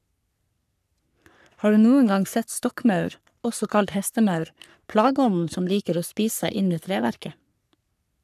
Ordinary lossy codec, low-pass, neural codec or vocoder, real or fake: none; 14.4 kHz; codec, 44.1 kHz, 3.4 kbps, Pupu-Codec; fake